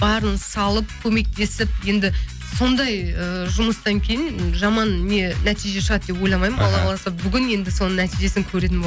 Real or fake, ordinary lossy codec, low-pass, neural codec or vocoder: real; none; none; none